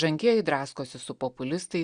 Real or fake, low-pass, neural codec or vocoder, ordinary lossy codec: real; 9.9 kHz; none; Opus, 64 kbps